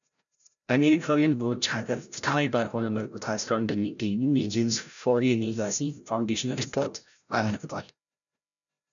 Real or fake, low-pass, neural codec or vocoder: fake; 7.2 kHz; codec, 16 kHz, 0.5 kbps, FreqCodec, larger model